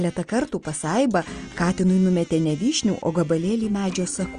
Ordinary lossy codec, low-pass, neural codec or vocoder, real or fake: Opus, 32 kbps; 9.9 kHz; none; real